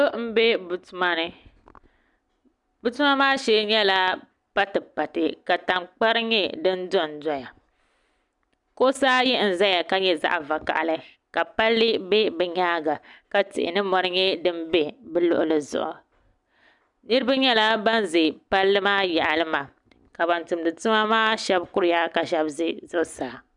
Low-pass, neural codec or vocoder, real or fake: 10.8 kHz; none; real